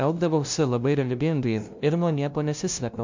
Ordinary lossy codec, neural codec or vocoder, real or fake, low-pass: MP3, 64 kbps; codec, 16 kHz, 0.5 kbps, FunCodec, trained on LibriTTS, 25 frames a second; fake; 7.2 kHz